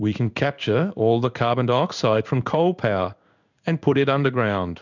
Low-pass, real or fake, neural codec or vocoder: 7.2 kHz; fake; codec, 16 kHz in and 24 kHz out, 1 kbps, XY-Tokenizer